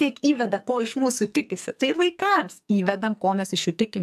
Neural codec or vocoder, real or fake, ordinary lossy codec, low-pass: codec, 44.1 kHz, 2.6 kbps, SNAC; fake; AAC, 96 kbps; 14.4 kHz